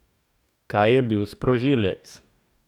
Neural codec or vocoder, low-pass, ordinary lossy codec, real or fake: codec, 44.1 kHz, 2.6 kbps, DAC; 19.8 kHz; none; fake